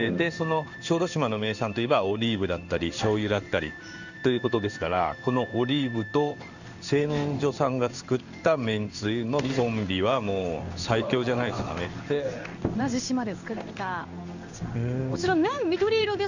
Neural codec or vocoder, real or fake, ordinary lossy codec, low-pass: codec, 16 kHz in and 24 kHz out, 1 kbps, XY-Tokenizer; fake; none; 7.2 kHz